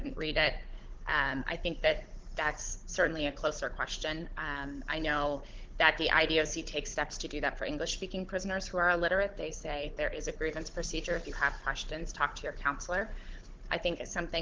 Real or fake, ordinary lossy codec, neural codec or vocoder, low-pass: fake; Opus, 16 kbps; codec, 16 kHz, 16 kbps, FunCodec, trained on Chinese and English, 50 frames a second; 7.2 kHz